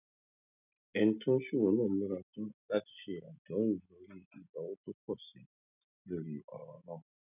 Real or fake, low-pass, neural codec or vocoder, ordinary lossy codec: real; 3.6 kHz; none; none